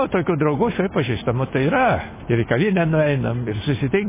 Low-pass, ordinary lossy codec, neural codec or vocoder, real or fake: 3.6 kHz; MP3, 16 kbps; none; real